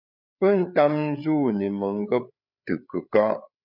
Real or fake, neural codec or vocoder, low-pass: fake; codec, 16 kHz, 8 kbps, FreqCodec, larger model; 5.4 kHz